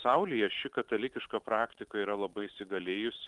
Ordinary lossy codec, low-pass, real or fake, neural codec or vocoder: Opus, 32 kbps; 10.8 kHz; fake; vocoder, 44.1 kHz, 128 mel bands every 512 samples, BigVGAN v2